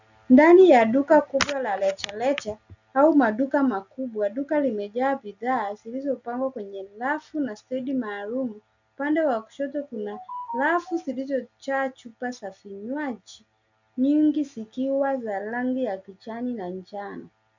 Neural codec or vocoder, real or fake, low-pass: none; real; 7.2 kHz